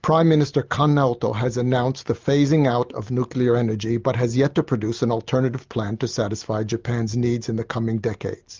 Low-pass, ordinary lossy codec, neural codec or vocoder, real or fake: 7.2 kHz; Opus, 32 kbps; none; real